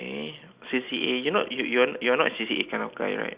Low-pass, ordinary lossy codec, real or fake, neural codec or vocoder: 3.6 kHz; Opus, 64 kbps; real; none